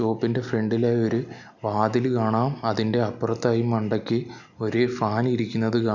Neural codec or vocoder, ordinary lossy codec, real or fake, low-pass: none; none; real; 7.2 kHz